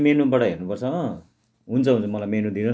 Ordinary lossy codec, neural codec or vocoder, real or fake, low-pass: none; none; real; none